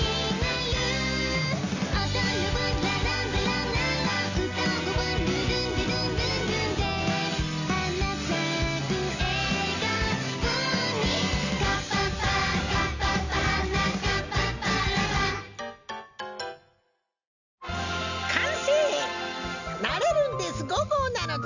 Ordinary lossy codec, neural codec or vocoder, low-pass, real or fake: none; none; 7.2 kHz; real